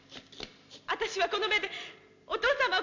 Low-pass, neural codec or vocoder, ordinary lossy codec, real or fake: 7.2 kHz; none; MP3, 64 kbps; real